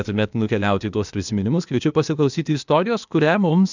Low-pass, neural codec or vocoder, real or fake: 7.2 kHz; codec, 16 kHz, 0.8 kbps, ZipCodec; fake